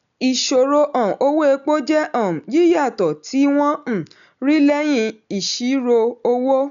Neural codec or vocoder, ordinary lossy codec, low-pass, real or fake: none; none; 7.2 kHz; real